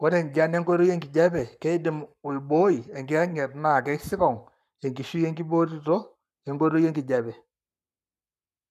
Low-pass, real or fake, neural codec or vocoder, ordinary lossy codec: 14.4 kHz; fake; codec, 44.1 kHz, 7.8 kbps, Pupu-Codec; none